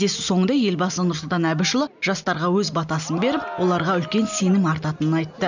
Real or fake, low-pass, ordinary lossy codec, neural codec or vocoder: real; 7.2 kHz; none; none